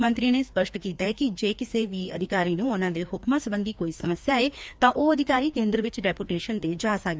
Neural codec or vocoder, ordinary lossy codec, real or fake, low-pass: codec, 16 kHz, 2 kbps, FreqCodec, larger model; none; fake; none